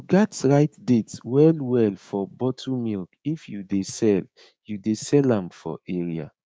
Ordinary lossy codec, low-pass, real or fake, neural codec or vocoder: none; none; fake; codec, 16 kHz, 6 kbps, DAC